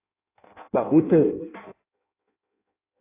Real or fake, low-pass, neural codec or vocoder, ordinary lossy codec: fake; 3.6 kHz; codec, 16 kHz in and 24 kHz out, 0.6 kbps, FireRedTTS-2 codec; AAC, 32 kbps